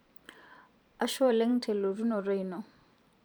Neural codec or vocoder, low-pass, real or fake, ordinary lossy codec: vocoder, 44.1 kHz, 128 mel bands every 512 samples, BigVGAN v2; none; fake; none